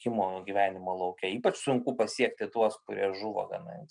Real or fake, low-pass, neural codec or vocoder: real; 9.9 kHz; none